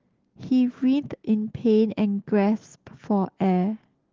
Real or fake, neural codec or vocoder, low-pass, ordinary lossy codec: real; none; 7.2 kHz; Opus, 16 kbps